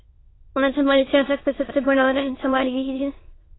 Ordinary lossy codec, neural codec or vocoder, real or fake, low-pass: AAC, 16 kbps; autoencoder, 22.05 kHz, a latent of 192 numbers a frame, VITS, trained on many speakers; fake; 7.2 kHz